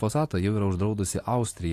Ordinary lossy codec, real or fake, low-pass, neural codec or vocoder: AAC, 48 kbps; real; 14.4 kHz; none